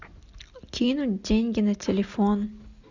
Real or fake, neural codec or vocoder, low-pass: real; none; 7.2 kHz